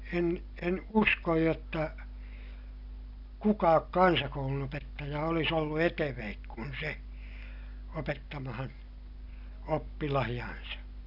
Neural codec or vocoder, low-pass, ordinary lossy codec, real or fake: none; 5.4 kHz; none; real